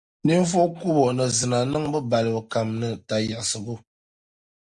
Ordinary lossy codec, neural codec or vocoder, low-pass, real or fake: Opus, 64 kbps; none; 10.8 kHz; real